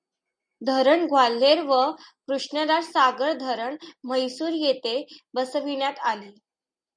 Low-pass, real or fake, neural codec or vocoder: 9.9 kHz; real; none